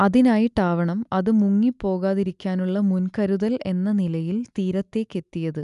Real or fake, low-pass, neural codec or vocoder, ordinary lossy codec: real; 10.8 kHz; none; none